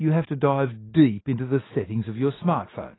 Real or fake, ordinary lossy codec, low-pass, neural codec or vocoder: real; AAC, 16 kbps; 7.2 kHz; none